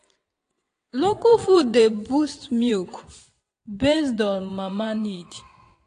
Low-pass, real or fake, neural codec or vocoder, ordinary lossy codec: 9.9 kHz; fake; vocoder, 22.05 kHz, 80 mel bands, WaveNeXt; AAC, 48 kbps